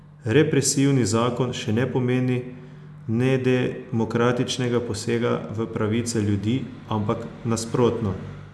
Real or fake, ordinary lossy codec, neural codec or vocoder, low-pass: real; none; none; none